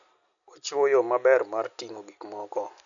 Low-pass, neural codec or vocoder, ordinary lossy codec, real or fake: 7.2 kHz; none; none; real